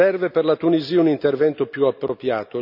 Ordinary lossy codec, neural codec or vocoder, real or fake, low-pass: none; none; real; 5.4 kHz